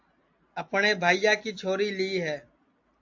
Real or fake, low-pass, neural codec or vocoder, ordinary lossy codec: real; 7.2 kHz; none; AAC, 48 kbps